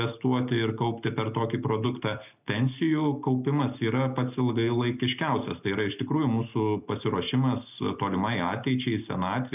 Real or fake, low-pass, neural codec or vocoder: real; 3.6 kHz; none